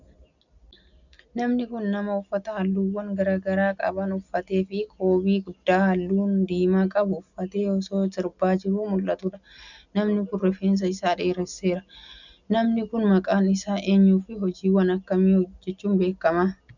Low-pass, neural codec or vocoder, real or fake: 7.2 kHz; none; real